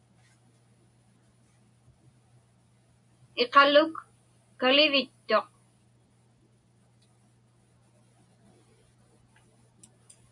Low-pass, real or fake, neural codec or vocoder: 10.8 kHz; real; none